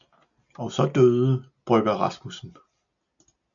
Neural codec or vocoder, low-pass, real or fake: none; 7.2 kHz; real